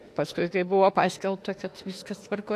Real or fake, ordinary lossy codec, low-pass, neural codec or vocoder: fake; AAC, 64 kbps; 14.4 kHz; autoencoder, 48 kHz, 32 numbers a frame, DAC-VAE, trained on Japanese speech